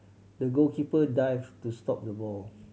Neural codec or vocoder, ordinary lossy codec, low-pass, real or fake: none; none; none; real